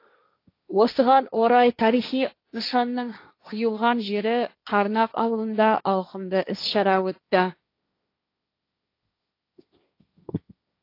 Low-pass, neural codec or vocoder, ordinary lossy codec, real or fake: 5.4 kHz; codec, 16 kHz, 1.1 kbps, Voila-Tokenizer; AAC, 32 kbps; fake